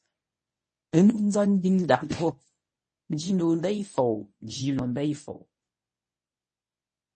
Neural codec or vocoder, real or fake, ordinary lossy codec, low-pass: codec, 24 kHz, 0.9 kbps, WavTokenizer, medium speech release version 1; fake; MP3, 32 kbps; 10.8 kHz